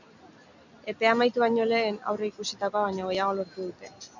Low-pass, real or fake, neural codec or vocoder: 7.2 kHz; real; none